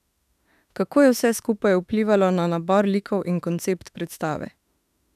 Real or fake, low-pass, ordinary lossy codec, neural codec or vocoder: fake; 14.4 kHz; none; autoencoder, 48 kHz, 32 numbers a frame, DAC-VAE, trained on Japanese speech